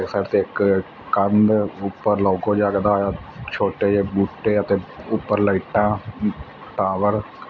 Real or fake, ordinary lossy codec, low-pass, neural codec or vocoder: real; none; 7.2 kHz; none